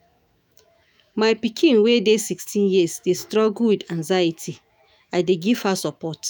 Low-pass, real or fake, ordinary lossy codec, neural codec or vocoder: none; fake; none; autoencoder, 48 kHz, 128 numbers a frame, DAC-VAE, trained on Japanese speech